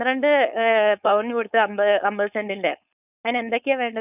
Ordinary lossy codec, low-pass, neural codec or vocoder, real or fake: none; 3.6 kHz; codec, 16 kHz, 4.8 kbps, FACodec; fake